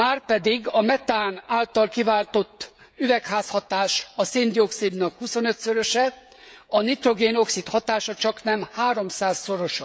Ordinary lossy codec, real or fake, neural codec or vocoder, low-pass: none; fake; codec, 16 kHz, 16 kbps, FreqCodec, smaller model; none